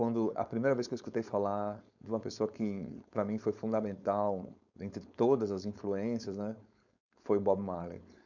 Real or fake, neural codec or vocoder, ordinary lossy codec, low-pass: fake; codec, 16 kHz, 4.8 kbps, FACodec; none; 7.2 kHz